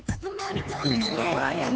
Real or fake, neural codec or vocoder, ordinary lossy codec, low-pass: fake; codec, 16 kHz, 4 kbps, X-Codec, HuBERT features, trained on LibriSpeech; none; none